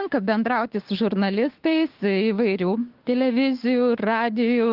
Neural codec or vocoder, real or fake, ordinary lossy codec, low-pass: codec, 16 kHz, 6 kbps, DAC; fake; Opus, 16 kbps; 5.4 kHz